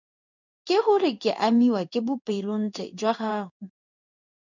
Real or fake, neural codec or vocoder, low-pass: fake; codec, 16 kHz in and 24 kHz out, 1 kbps, XY-Tokenizer; 7.2 kHz